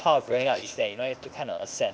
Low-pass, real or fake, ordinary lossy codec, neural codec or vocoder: none; fake; none; codec, 16 kHz, 0.8 kbps, ZipCodec